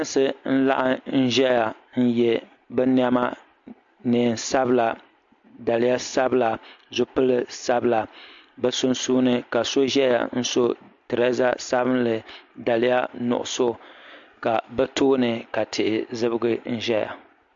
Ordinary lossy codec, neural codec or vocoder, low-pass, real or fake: MP3, 48 kbps; none; 7.2 kHz; real